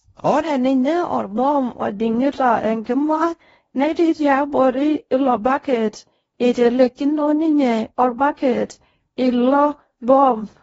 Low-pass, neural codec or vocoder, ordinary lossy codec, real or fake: 10.8 kHz; codec, 16 kHz in and 24 kHz out, 0.6 kbps, FocalCodec, streaming, 4096 codes; AAC, 24 kbps; fake